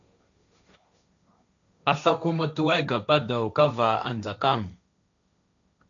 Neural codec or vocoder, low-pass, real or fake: codec, 16 kHz, 1.1 kbps, Voila-Tokenizer; 7.2 kHz; fake